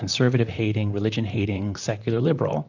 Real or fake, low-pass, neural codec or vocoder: fake; 7.2 kHz; vocoder, 44.1 kHz, 128 mel bands, Pupu-Vocoder